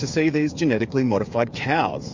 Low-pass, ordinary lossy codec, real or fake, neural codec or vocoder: 7.2 kHz; MP3, 48 kbps; fake; codec, 16 kHz, 8 kbps, FreqCodec, smaller model